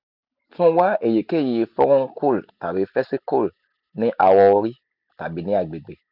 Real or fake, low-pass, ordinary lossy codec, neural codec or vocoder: real; 5.4 kHz; none; none